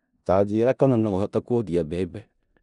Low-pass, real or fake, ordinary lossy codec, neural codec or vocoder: 10.8 kHz; fake; none; codec, 16 kHz in and 24 kHz out, 0.4 kbps, LongCat-Audio-Codec, four codebook decoder